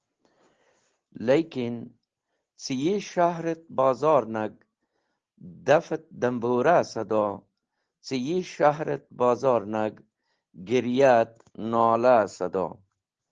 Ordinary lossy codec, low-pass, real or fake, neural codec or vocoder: Opus, 16 kbps; 7.2 kHz; real; none